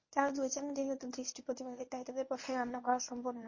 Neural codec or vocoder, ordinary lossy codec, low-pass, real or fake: codec, 24 kHz, 0.9 kbps, WavTokenizer, medium speech release version 1; MP3, 32 kbps; 7.2 kHz; fake